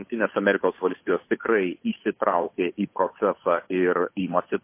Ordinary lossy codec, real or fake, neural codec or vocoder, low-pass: MP3, 24 kbps; fake; codec, 16 kHz, 8 kbps, FunCodec, trained on Chinese and English, 25 frames a second; 3.6 kHz